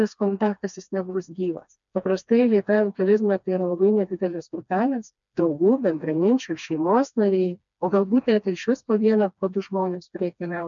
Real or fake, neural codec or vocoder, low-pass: fake; codec, 16 kHz, 2 kbps, FreqCodec, smaller model; 7.2 kHz